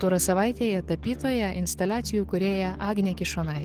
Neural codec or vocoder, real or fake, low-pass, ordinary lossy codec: codec, 44.1 kHz, 7.8 kbps, DAC; fake; 14.4 kHz; Opus, 16 kbps